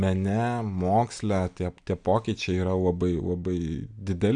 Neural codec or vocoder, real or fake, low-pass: none; real; 9.9 kHz